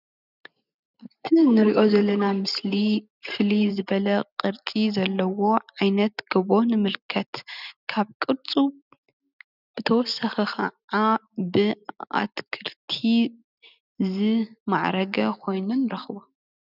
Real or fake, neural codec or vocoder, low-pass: real; none; 5.4 kHz